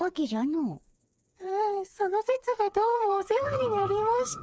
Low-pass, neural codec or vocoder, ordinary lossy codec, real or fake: none; codec, 16 kHz, 4 kbps, FreqCodec, smaller model; none; fake